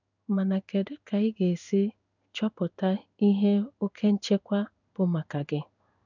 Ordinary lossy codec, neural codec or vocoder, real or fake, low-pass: none; codec, 16 kHz in and 24 kHz out, 1 kbps, XY-Tokenizer; fake; 7.2 kHz